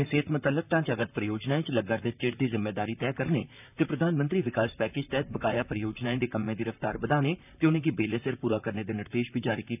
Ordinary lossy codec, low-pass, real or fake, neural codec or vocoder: none; 3.6 kHz; fake; vocoder, 44.1 kHz, 128 mel bands, Pupu-Vocoder